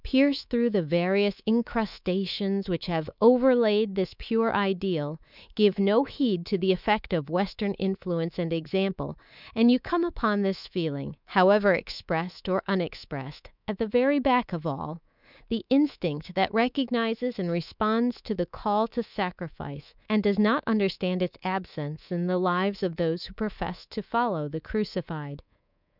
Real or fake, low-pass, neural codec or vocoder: fake; 5.4 kHz; codec, 24 kHz, 3.1 kbps, DualCodec